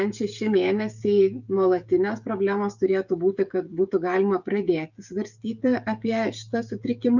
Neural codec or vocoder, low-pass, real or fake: codec, 16 kHz, 16 kbps, FreqCodec, smaller model; 7.2 kHz; fake